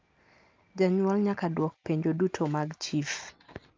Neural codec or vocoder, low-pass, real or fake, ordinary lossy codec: none; 7.2 kHz; real; Opus, 24 kbps